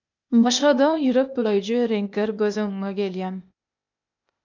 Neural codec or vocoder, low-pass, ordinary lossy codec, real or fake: codec, 16 kHz, 0.8 kbps, ZipCodec; 7.2 kHz; MP3, 64 kbps; fake